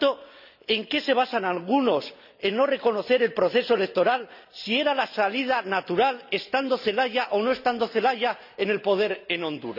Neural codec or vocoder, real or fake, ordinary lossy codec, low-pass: none; real; none; 5.4 kHz